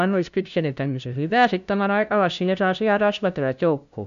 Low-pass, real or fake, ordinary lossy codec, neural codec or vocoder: 7.2 kHz; fake; AAC, 96 kbps; codec, 16 kHz, 0.5 kbps, FunCodec, trained on LibriTTS, 25 frames a second